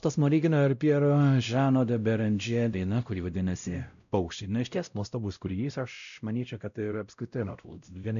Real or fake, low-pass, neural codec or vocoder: fake; 7.2 kHz; codec, 16 kHz, 0.5 kbps, X-Codec, WavLM features, trained on Multilingual LibriSpeech